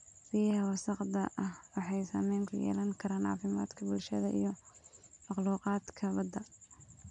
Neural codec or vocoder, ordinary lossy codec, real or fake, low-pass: none; none; real; 9.9 kHz